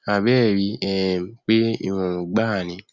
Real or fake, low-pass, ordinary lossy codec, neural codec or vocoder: real; 7.2 kHz; Opus, 64 kbps; none